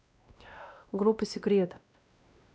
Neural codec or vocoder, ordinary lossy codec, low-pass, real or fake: codec, 16 kHz, 1 kbps, X-Codec, WavLM features, trained on Multilingual LibriSpeech; none; none; fake